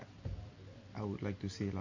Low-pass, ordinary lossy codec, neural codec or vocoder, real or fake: 7.2 kHz; MP3, 32 kbps; none; real